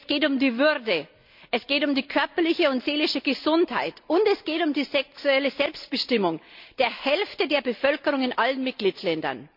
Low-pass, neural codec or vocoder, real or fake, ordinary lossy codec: 5.4 kHz; none; real; MP3, 48 kbps